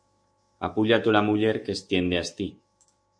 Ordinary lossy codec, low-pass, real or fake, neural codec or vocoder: MP3, 48 kbps; 9.9 kHz; fake; autoencoder, 48 kHz, 128 numbers a frame, DAC-VAE, trained on Japanese speech